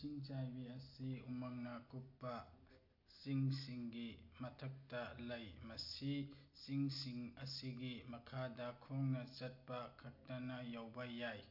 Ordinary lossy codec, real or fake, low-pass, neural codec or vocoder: AAC, 48 kbps; real; 5.4 kHz; none